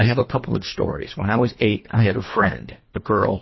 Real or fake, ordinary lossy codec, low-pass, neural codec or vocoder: fake; MP3, 24 kbps; 7.2 kHz; codec, 24 kHz, 1.5 kbps, HILCodec